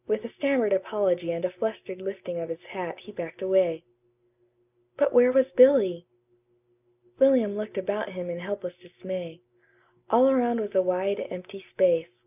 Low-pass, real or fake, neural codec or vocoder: 3.6 kHz; real; none